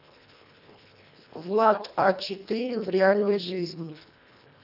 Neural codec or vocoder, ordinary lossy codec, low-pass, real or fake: codec, 24 kHz, 1.5 kbps, HILCodec; none; 5.4 kHz; fake